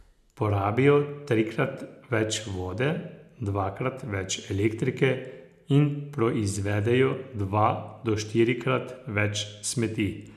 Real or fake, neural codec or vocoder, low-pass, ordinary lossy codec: real; none; 14.4 kHz; none